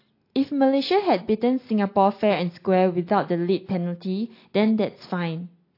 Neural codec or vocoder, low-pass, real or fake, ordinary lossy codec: none; 5.4 kHz; real; AAC, 32 kbps